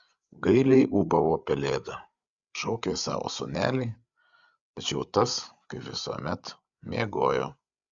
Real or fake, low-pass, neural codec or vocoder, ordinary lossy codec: fake; 7.2 kHz; codec, 16 kHz, 8 kbps, FreqCodec, larger model; Opus, 64 kbps